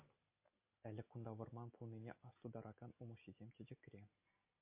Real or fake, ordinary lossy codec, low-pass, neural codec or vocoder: real; MP3, 24 kbps; 3.6 kHz; none